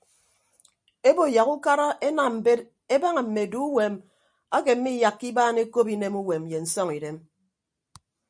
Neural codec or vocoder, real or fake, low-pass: none; real; 9.9 kHz